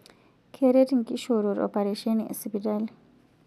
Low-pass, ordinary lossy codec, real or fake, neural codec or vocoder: 14.4 kHz; none; real; none